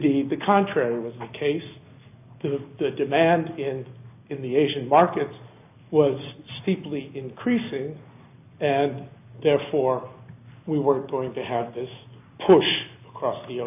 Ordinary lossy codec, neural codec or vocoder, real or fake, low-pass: AAC, 32 kbps; none; real; 3.6 kHz